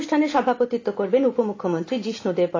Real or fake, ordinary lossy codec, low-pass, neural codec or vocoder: real; AAC, 32 kbps; 7.2 kHz; none